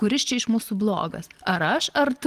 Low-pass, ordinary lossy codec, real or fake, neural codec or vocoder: 14.4 kHz; Opus, 24 kbps; real; none